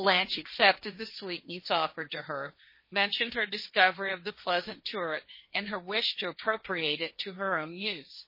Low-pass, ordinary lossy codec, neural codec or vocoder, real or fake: 5.4 kHz; MP3, 24 kbps; codec, 16 kHz, 1.1 kbps, Voila-Tokenizer; fake